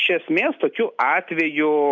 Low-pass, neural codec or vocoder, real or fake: 7.2 kHz; none; real